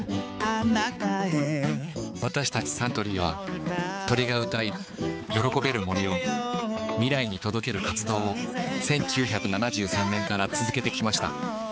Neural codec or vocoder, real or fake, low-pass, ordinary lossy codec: codec, 16 kHz, 4 kbps, X-Codec, HuBERT features, trained on balanced general audio; fake; none; none